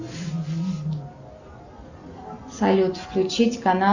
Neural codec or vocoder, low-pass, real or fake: none; 7.2 kHz; real